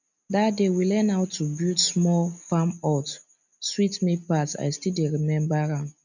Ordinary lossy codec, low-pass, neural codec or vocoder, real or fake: none; 7.2 kHz; none; real